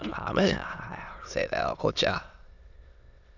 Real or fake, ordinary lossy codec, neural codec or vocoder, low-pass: fake; none; autoencoder, 22.05 kHz, a latent of 192 numbers a frame, VITS, trained on many speakers; 7.2 kHz